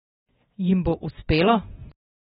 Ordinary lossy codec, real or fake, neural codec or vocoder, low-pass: AAC, 16 kbps; real; none; 19.8 kHz